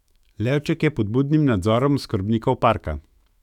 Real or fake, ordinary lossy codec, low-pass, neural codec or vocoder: fake; none; 19.8 kHz; autoencoder, 48 kHz, 128 numbers a frame, DAC-VAE, trained on Japanese speech